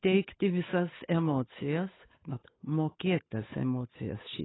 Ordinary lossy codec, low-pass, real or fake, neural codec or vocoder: AAC, 16 kbps; 7.2 kHz; fake; codec, 16 kHz, 8 kbps, FunCodec, trained on Chinese and English, 25 frames a second